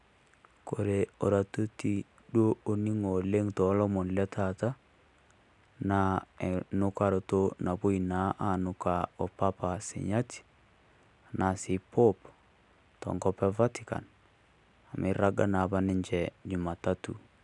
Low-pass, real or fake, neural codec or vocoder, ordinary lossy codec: 10.8 kHz; real; none; none